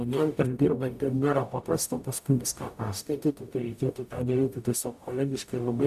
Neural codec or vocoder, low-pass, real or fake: codec, 44.1 kHz, 0.9 kbps, DAC; 14.4 kHz; fake